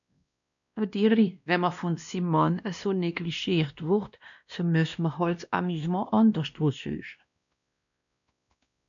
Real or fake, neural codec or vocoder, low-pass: fake; codec, 16 kHz, 1 kbps, X-Codec, WavLM features, trained on Multilingual LibriSpeech; 7.2 kHz